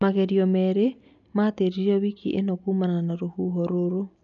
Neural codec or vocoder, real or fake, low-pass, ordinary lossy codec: none; real; 7.2 kHz; MP3, 96 kbps